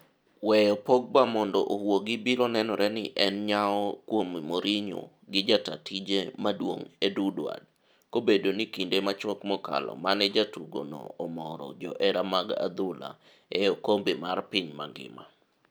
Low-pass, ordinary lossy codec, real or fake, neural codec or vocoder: none; none; real; none